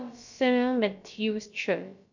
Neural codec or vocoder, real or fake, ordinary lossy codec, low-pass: codec, 16 kHz, about 1 kbps, DyCAST, with the encoder's durations; fake; none; 7.2 kHz